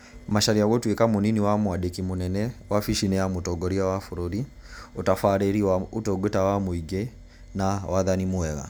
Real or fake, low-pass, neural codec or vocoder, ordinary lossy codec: real; none; none; none